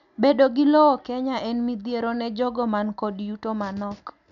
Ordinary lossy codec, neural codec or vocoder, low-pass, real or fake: none; none; 7.2 kHz; real